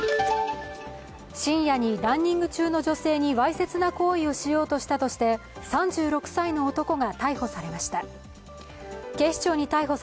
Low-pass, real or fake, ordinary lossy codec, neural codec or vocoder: none; real; none; none